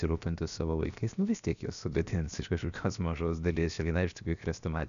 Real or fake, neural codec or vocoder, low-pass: fake; codec, 16 kHz, 0.7 kbps, FocalCodec; 7.2 kHz